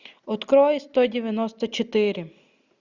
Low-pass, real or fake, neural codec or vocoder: 7.2 kHz; real; none